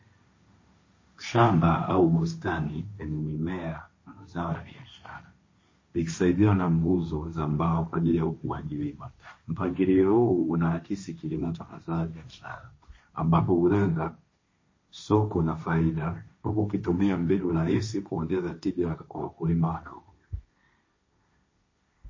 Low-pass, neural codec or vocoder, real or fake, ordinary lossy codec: 7.2 kHz; codec, 16 kHz, 1.1 kbps, Voila-Tokenizer; fake; MP3, 32 kbps